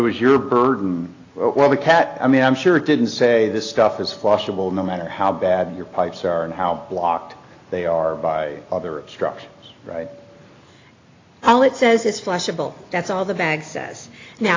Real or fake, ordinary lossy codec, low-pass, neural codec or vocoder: real; AAC, 32 kbps; 7.2 kHz; none